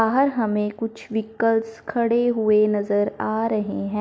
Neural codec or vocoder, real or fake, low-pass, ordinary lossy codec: none; real; none; none